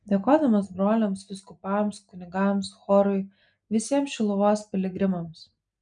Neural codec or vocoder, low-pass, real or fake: none; 9.9 kHz; real